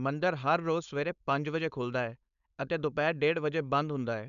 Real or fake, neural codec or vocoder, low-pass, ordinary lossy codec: fake; codec, 16 kHz, 4.8 kbps, FACodec; 7.2 kHz; none